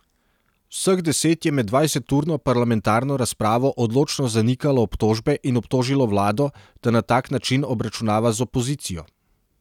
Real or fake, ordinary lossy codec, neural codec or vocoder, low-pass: real; none; none; 19.8 kHz